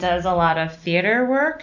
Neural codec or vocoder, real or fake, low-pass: none; real; 7.2 kHz